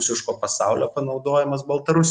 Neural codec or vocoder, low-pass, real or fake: none; 10.8 kHz; real